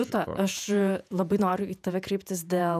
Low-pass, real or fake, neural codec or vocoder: 14.4 kHz; fake; vocoder, 48 kHz, 128 mel bands, Vocos